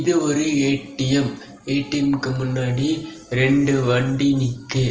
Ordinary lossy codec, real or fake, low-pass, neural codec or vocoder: Opus, 24 kbps; real; 7.2 kHz; none